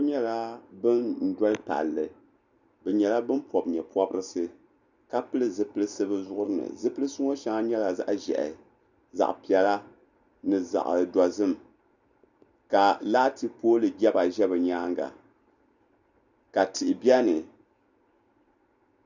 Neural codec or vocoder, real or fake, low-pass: none; real; 7.2 kHz